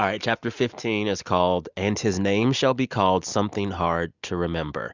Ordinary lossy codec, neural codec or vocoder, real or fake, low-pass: Opus, 64 kbps; none; real; 7.2 kHz